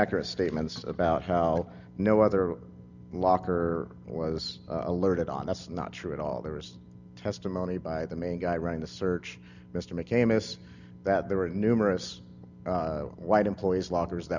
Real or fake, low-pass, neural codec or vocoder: real; 7.2 kHz; none